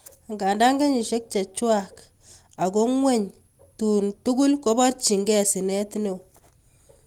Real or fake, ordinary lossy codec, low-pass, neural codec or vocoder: real; Opus, 24 kbps; 19.8 kHz; none